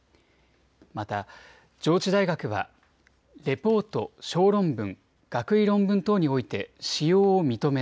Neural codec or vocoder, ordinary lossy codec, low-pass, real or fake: none; none; none; real